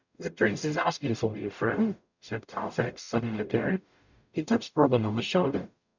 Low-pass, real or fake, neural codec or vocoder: 7.2 kHz; fake; codec, 44.1 kHz, 0.9 kbps, DAC